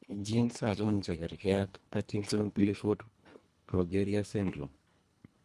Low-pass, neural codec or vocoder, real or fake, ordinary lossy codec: none; codec, 24 kHz, 1.5 kbps, HILCodec; fake; none